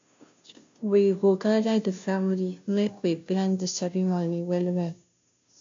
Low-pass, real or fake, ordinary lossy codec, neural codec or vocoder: 7.2 kHz; fake; AAC, 64 kbps; codec, 16 kHz, 0.5 kbps, FunCodec, trained on Chinese and English, 25 frames a second